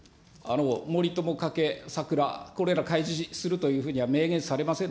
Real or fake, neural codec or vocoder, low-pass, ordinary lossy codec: real; none; none; none